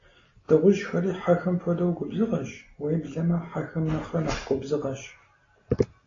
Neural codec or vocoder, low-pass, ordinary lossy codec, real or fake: none; 7.2 kHz; AAC, 32 kbps; real